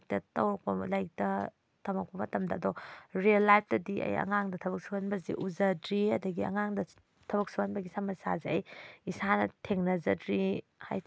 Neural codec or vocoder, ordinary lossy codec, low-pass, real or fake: none; none; none; real